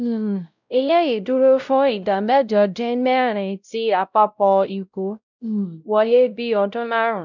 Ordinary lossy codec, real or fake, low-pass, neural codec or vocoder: none; fake; 7.2 kHz; codec, 16 kHz, 0.5 kbps, X-Codec, WavLM features, trained on Multilingual LibriSpeech